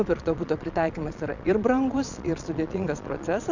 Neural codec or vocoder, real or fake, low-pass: vocoder, 22.05 kHz, 80 mel bands, Vocos; fake; 7.2 kHz